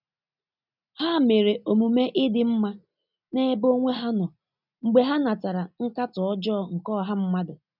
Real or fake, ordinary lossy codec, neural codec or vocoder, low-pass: real; none; none; 5.4 kHz